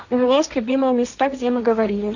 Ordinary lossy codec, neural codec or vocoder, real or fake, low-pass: none; codec, 16 kHz, 1.1 kbps, Voila-Tokenizer; fake; 7.2 kHz